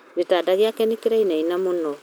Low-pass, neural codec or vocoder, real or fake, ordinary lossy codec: none; none; real; none